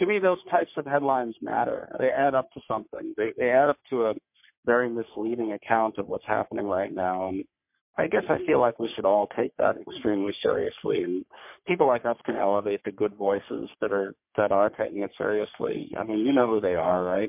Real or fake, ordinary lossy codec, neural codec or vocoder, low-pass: fake; MP3, 32 kbps; codec, 44.1 kHz, 3.4 kbps, Pupu-Codec; 3.6 kHz